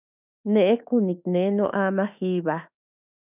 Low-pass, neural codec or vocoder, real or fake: 3.6 kHz; autoencoder, 48 kHz, 32 numbers a frame, DAC-VAE, trained on Japanese speech; fake